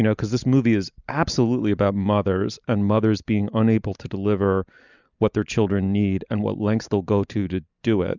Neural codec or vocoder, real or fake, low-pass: none; real; 7.2 kHz